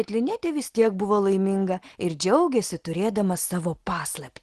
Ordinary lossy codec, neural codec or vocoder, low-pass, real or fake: Opus, 16 kbps; none; 10.8 kHz; real